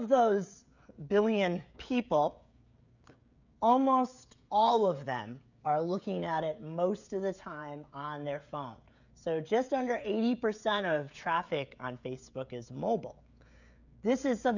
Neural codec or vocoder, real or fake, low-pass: codec, 16 kHz, 16 kbps, FreqCodec, smaller model; fake; 7.2 kHz